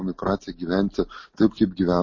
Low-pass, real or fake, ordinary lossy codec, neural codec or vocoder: 7.2 kHz; real; MP3, 32 kbps; none